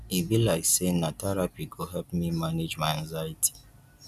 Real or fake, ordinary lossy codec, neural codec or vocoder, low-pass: real; none; none; 14.4 kHz